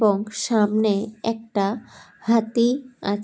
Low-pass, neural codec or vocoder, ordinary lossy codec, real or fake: none; none; none; real